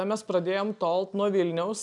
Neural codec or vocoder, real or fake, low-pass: none; real; 10.8 kHz